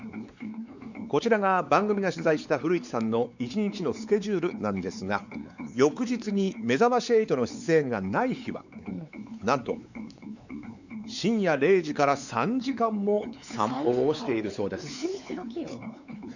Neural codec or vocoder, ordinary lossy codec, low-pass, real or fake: codec, 16 kHz, 4 kbps, X-Codec, WavLM features, trained on Multilingual LibriSpeech; none; 7.2 kHz; fake